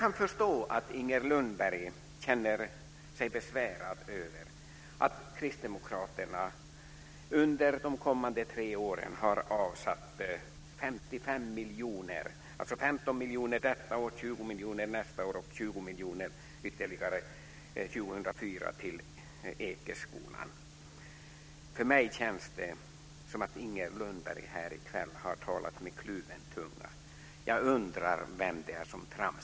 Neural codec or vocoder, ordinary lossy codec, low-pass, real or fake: none; none; none; real